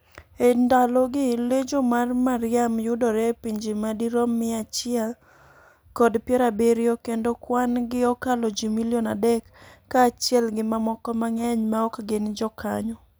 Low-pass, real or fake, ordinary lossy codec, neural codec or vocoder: none; real; none; none